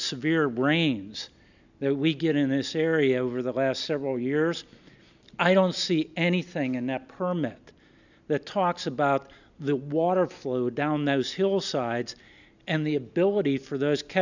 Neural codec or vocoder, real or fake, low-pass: none; real; 7.2 kHz